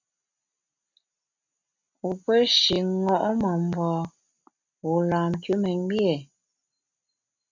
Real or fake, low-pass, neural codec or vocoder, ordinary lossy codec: real; 7.2 kHz; none; MP3, 64 kbps